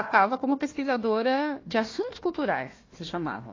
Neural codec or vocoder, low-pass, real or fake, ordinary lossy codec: codec, 16 kHz, 1 kbps, FunCodec, trained on Chinese and English, 50 frames a second; 7.2 kHz; fake; AAC, 32 kbps